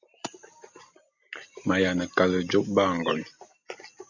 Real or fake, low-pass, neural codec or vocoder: real; 7.2 kHz; none